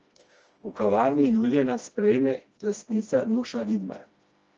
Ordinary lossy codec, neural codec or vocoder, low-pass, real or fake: Opus, 24 kbps; codec, 16 kHz, 1 kbps, FreqCodec, smaller model; 7.2 kHz; fake